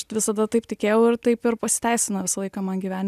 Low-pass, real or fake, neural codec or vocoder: 14.4 kHz; real; none